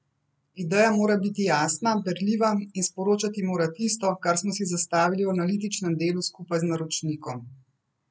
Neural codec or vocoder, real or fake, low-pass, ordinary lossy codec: none; real; none; none